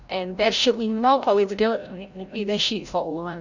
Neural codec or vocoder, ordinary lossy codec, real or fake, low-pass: codec, 16 kHz, 0.5 kbps, FreqCodec, larger model; none; fake; 7.2 kHz